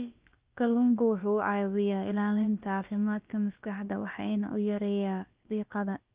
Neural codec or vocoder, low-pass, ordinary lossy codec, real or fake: codec, 16 kHz, about 1 kbps, DyCAST, with the encoder's durations; 3.6 kHz; Opus, 24 kbps; fake